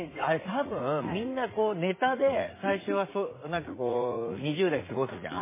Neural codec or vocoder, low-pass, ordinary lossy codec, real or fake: vocoder, 44.1 kHz, 80 mel bands, Vocos; 3.6 kHz; MP3, 16 kbps; fake